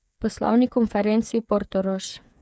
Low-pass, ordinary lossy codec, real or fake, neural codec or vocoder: none; none; fake; codec, 16 kHz, 8 kbps, FreqCodec, smaller model